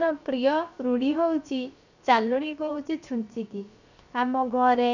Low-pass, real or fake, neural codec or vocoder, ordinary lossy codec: 7.2 kHz; fake; codec, 16 kHz, 0.7 kbps, FocalCodec; none